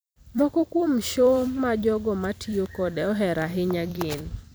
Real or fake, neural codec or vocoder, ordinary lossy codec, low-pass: fake; vocoder, 44.1 kHz, 128 mel bands every 256 samples, BigVGAN v2; none; none